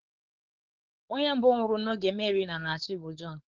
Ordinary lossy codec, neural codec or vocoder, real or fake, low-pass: Opus, 16 kbps; codec, 16 kHz, 4.8 kbps, FACodec; fake; 7.2 kHz